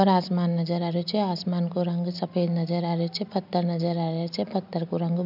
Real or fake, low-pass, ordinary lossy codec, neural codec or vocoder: real; 5.4 kHz; none; none